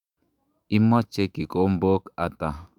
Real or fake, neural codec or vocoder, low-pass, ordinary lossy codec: fake; autoencoder, 48 kHz, 128 numbers a frame, DAC-VAE, trained on Japanese speech; 19.8 kHz; Opus, 64 kbps